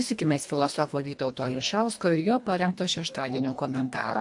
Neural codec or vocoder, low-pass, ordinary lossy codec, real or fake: codec, 24 kHz, 1.5 kbps, HILCodec; 10.8 kHz; AAC, 64 kbps; fake